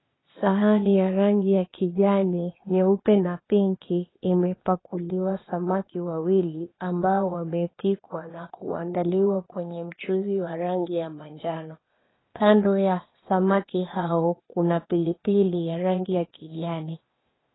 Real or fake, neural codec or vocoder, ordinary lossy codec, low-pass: fake; codec, 16 kHz, 0.8 kbps, ZipCodec; AAC, 16 kbps; 7.2 kHz